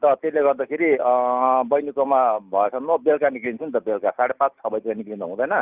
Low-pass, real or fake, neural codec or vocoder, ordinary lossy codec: 3.6 kHz; real; none; Opus, 24 kbps